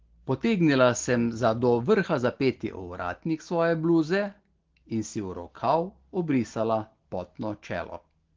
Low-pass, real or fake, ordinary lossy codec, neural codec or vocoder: 7.2 kHz; real; Opus, 16 kbps; none